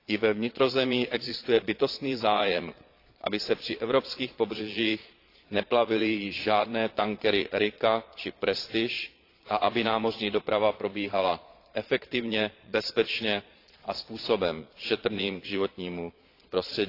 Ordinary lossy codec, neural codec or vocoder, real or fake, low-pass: AAC, 32 kbps; vocoder, 22.05 kHz, 80 mel bands, Vocos; fake; 5.4 kHz